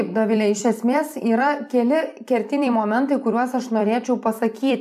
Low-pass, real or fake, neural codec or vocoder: 14.4 kHz; fake; vocoder, 44.1 kHz, 128 mel bands every 256 samples, BigVGAN v2